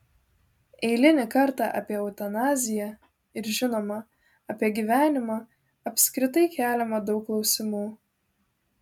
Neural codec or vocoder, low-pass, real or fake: none; 19.8 kHz; real